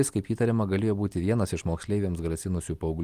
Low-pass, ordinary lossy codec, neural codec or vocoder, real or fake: 14.4 kHz; Opus, 24 kbps; none; real